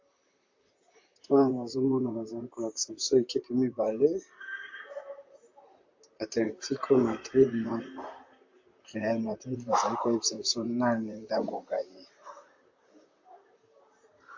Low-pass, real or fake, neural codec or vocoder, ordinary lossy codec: 7.2 kHz; fake; vocoder, 44.1 kHz, 128 mel bands, Pupu-Vocoder; MP3, 48 kbps